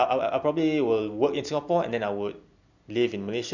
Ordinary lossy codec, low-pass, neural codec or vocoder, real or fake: none; 7.2 kHz; none; real